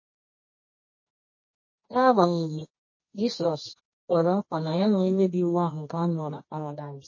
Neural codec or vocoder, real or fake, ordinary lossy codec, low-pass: codec, 24 kHz, 0.9 kbps, WavTokenizer, medium music audio release; fake; MP3, 32 kbps; 7.2 kHz